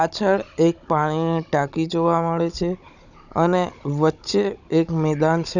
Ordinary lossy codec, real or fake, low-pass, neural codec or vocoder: none; fake; 7.2 kHz; codec, 16 kHz, 16 kbps, FunCodec, trained on Chinese and English, 50 frames a second